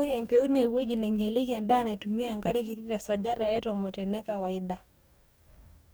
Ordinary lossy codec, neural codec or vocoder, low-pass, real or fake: none; codec, 44.1 kHz, 2.6 kbps, DAC; none; fake